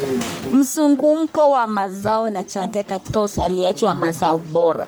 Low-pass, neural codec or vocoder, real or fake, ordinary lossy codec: none; codec, 44.1 kHz, 1.7 kbps, Pupu-Codec; fake; none